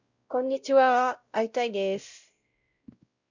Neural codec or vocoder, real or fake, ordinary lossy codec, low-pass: codec, 16 kHz, 0.5 kbps, X-Codec, WavLM features, trained on Multilingual LibriSpeech; fake; Opus, 64 kbps; 7.2 kHz